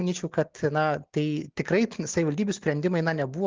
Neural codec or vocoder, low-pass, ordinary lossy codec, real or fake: none; 7.2 kHz; Opus, 24 kbps; real